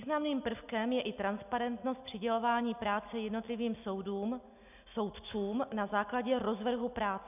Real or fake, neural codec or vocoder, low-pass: real; none; 3.6 kHz